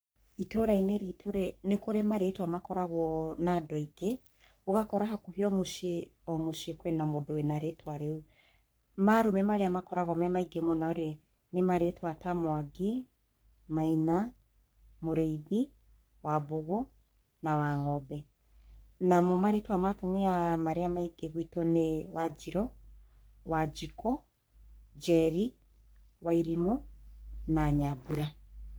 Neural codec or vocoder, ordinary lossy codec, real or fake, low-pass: codec, 44.1 kHz, 3.4 kbps, Pupu-Codec; none; fake; none